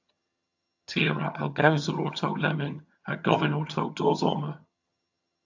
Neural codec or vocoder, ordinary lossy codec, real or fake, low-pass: vocoder, 22.05 kHz, 80 mel bands, HiFi-GAN; AAC, 48 kbps; fake; 7.2 kHz